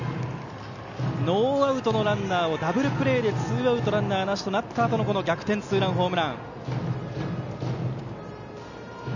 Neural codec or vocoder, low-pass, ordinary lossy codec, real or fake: none; 7.2 kHz; none; real